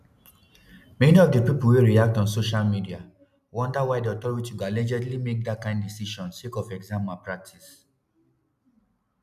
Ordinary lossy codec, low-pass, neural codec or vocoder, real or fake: none; 14.4 kHz; none; real